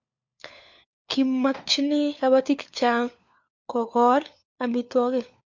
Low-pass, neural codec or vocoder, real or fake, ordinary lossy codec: 7.2 kHz; codec, 16 kHz, 4 kbps, FunCodec, trained on LibriTTS, 50 frames a second; fake; MP3, 64 kbps